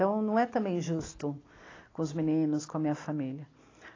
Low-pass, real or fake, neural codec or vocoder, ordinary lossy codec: 7.2 kHz; real; none; AAC, 32 kbps